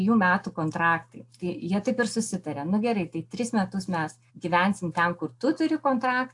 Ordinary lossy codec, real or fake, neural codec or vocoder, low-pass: AAC, 64 kbps; real; none; 10.8 kHz